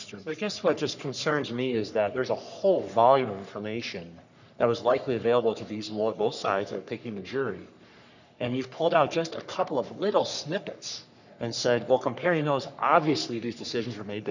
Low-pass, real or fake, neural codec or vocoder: 7.2 kHz; fake; codec, 44.1 kHz, 3.4 kbps, Pupu-Codec